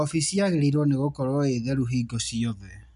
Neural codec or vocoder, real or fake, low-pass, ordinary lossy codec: none; real; 10.8 kHz; none